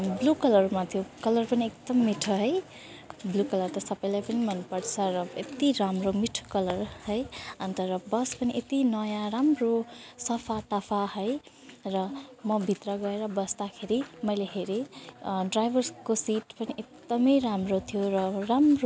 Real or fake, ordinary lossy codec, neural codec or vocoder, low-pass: real; none; none; none